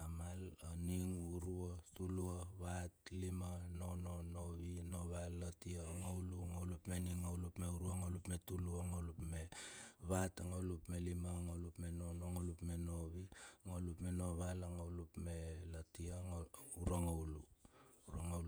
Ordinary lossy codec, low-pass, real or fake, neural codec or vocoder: none; none; fake; vocoder, 48 kHz, 128 mel bands, Vocos